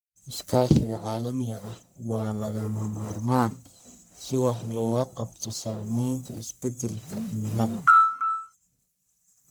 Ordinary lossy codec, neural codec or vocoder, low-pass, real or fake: none; codec, 44.1 kHz, 1.7 kbps, Pupu-Codec; none; fake